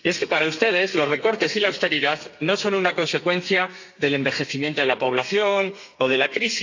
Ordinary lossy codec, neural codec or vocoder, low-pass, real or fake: AAC, 48 kbps; codec, 32 kHz, 1.9 kbps, SNAC; 7.2 kHz; fake